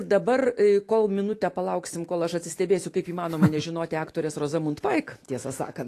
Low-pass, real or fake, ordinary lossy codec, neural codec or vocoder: 14.4 kHz; fake; AAC, 48 kbps; autoencoder, 48 kHz, 128 numbers a frame, DAC-VAE, trained on Japanese speech